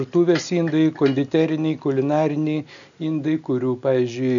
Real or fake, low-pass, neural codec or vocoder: real; 7.2 kHz; none